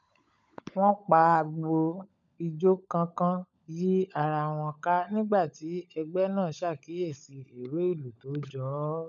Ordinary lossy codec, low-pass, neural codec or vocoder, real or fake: none; 7.2 kHz; codec, 16 kHz, 16 kbps, FunCodec, trained on LibriTTS, 50 frames a second; fake